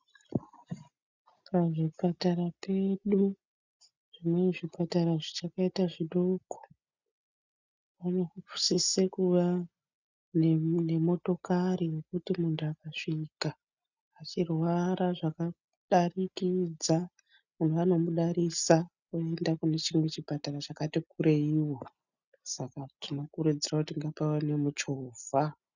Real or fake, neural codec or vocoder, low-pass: real; none; 7.2 kHz